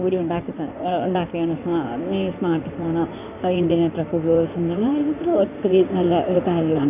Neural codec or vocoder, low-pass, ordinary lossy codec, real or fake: codec, 16 kHz in and 24 kHz out, 2.2 kbps, FireRedTTS-2 codec; 3.6 kHz; AAC, 32 kbps; fake